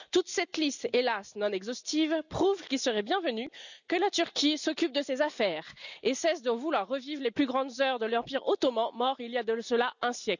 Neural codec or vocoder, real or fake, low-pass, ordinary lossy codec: none; real; 7.2 kHz; none